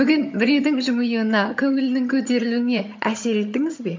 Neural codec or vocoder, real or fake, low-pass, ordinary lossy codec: vocoder, 22.05 kHz, 80 mel bands, HiFi-GAN; fake; 7.2 kHz; MP3, 48 kbps